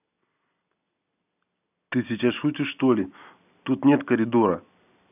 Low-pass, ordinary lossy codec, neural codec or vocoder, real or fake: 3.6 kHz; none; none; real